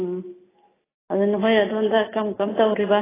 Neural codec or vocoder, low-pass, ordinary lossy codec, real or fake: none; 3.6 kHz; AAC, 16 kbps; real